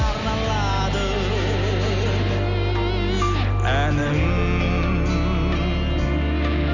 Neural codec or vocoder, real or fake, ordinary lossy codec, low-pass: none; real; none; 7.2 kHz